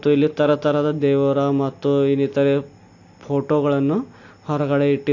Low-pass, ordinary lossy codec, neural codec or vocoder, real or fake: 7.2 kHz; AAC, 48 kbps; none; real